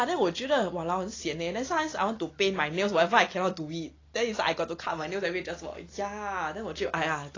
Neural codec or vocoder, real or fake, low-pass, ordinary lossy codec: none; real; 7.2 kHz; AAC, 32 kbps